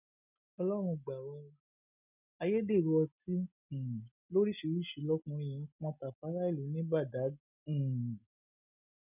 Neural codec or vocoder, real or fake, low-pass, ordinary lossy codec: none; real; 3.6 kHz; none